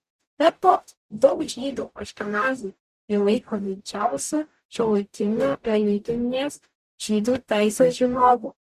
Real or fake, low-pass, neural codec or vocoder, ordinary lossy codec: fake; 14.4 kHz; codec, 44.1 kHz, 0.9 kbps, DAC; Opus, 64 kbps